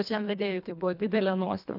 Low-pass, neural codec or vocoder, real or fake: 5.4 kHz; codec, 24 kHz, 1.5 kbps, HILCodec; fake